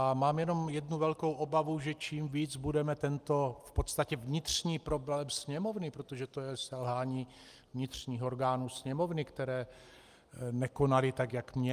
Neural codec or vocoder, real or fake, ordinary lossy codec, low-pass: none; real; Opus, 32 kbps; 14.4 kHz